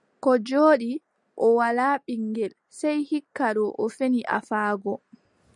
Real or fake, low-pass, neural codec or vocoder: real; 10.8 kHz; none